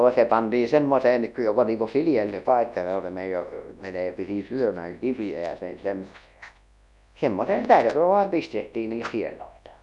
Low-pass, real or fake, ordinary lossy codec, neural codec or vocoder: 10.8 kHz; fake; none; codec, 24 kHz, 0.9 kbps, WavTokenizer, large speech release